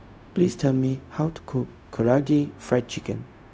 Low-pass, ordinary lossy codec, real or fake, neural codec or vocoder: none; none; fake; codec, 16 kHz, 0.4 kbps, LongCat-Audio-Codec